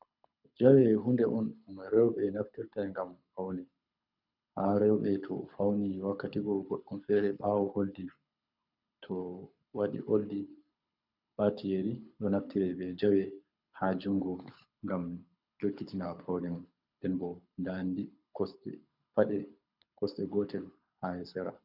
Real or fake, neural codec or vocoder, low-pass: fake; codec, 24 kHz, 6 kbps, HILCodec; 5.4 kHz